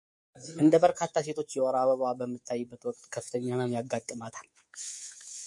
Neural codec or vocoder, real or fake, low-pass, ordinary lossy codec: codec, 24 kHz, 3.1 kbps, DualCodec; fake; 10.8 kHz; MP3, 48 kbps